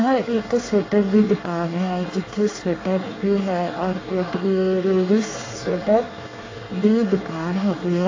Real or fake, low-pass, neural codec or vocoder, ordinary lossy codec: fake; 7.2 kHz; codec, 24 kHz, 1 kbps, SNAC; AAC, 32 kbps